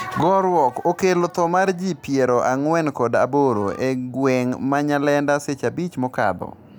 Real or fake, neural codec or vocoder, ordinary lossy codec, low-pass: real; none; none; none